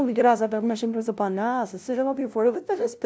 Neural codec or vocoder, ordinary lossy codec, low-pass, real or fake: codec, 16 kHz, 0.5 kbps, FunCodec, trained on LibriTTS, 25 frames a second; none; none; fake